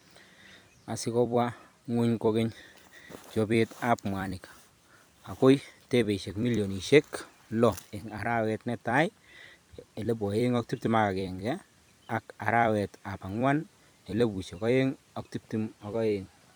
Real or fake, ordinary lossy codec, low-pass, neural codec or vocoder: fake; none; none; vocoder, 44.1 kHz, 128 mel bands every 256 samples, BigVGAN v2